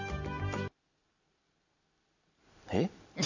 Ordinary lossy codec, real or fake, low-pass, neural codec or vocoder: none; real; 7.2 kHz; none